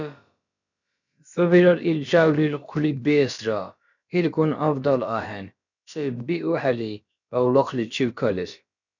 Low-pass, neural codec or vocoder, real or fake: 7.2 kHz; codec, 16 kHz, about 1 kbps, DyCAST, with the encoder's durations; fake